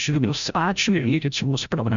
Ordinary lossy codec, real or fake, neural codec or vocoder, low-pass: Opus, 64 kbps; fake; codec, 16 kHz, 0.5 kbps, FreqCodec, larger model; 7.2 kHz